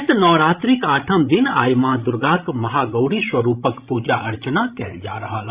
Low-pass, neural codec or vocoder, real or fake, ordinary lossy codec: 3.6 kHz; codec, 16 kHz, 16 kbps, FreqCodec, larger model; fake; Opus, 64 kbps